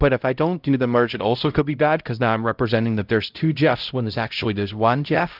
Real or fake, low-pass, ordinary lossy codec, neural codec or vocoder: fake; 5.4 kHz; Opus, 32 kbps; codec, 16 kHz, 0.5 kbps, X-Codec, HuBERT features, trained on LibriSpeech